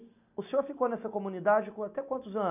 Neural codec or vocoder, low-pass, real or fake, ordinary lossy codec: none; 3.6 kHz; real; none